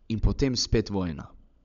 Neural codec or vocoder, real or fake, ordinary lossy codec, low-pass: codec, 16 kHz, 16 kbps, FunCodec, trained on LibriTTS, 50 frames a second; fake; none; 7.2 kHz